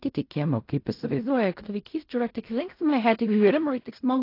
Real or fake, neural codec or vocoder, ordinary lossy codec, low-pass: fake; codec, 16 kHz in and 24 kHz out, 0.4 kbps, LongCat-Audio-Codec, fine tuned four codebook decoder; AAC, 32 kbps; 5.4 kHz